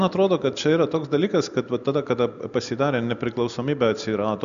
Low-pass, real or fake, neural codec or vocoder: 7.2 kHz; real; none